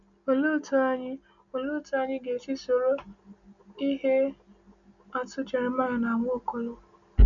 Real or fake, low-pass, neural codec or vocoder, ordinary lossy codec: real; 7.2 kHz; none; none